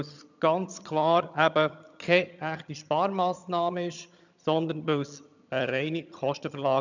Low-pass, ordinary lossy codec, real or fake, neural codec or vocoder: 7.2 kHz; none; fake; vocoder, 22.05 kHz, 80 mel bands, HiFi-GAN